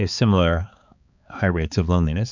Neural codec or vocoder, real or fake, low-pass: codec, 16 kHz, 4 kbps, X-Codec, HuBERT features, trained on balanced general audio; fake; 7.2 kHz